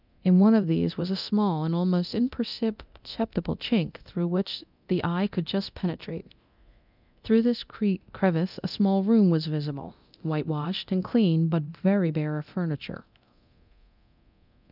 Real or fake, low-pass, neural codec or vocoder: fake; 5.4 kHz; codec, 24 kHz, 0.9 kbps, DualCodec